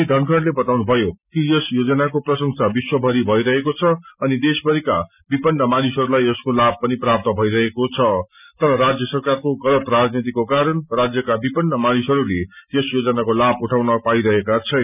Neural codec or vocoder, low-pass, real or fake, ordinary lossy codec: none; 3.6 kHz; real; none